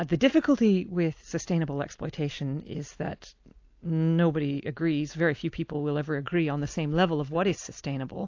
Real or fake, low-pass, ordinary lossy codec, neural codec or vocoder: real; 7.2 kHz; AAC, 48 kbps; none